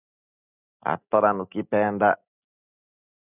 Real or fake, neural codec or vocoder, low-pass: real; none; 3.6 kHz